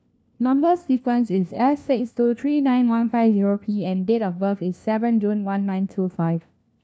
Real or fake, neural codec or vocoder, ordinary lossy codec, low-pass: fake; codec, 16 kHz, 1 kbps, FunCodec, trained on LibriTTS, 50 frames a second; none; none